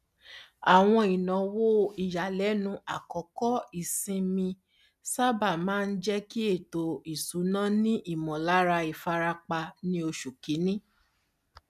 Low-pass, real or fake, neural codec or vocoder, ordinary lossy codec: 14.4 kHz; real; none; none